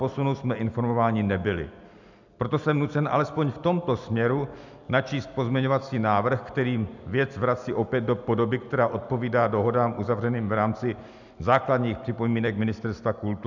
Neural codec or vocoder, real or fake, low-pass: none; real; 7.2 kHz